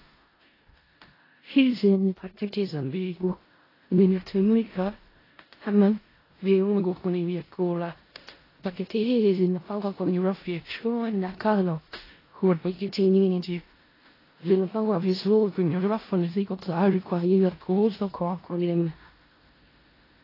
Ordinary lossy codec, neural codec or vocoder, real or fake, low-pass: AAC, 24 kbps; codec, 16 kHz in and 24 kHz out, 0.4 kbps, LongCat-Audio-Codec, four codebook decoder; fake; 5.4 kHz